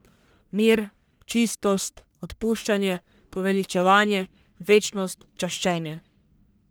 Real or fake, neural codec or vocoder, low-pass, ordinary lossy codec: fake; codec, 44.1 kHz, 1.7 kbps, Pupu-Codec; none; none